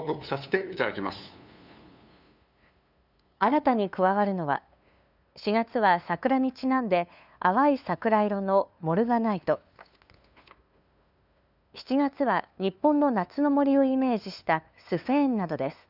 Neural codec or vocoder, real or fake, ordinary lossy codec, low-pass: codec, 16 kHz, 2 kbps, FunCodec, trained on LibriTTS, 25 frames a second; fake; none; 5.4 kHz